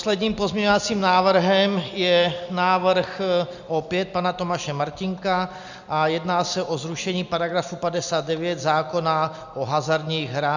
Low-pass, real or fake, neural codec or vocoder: 7.2 kHz; real; none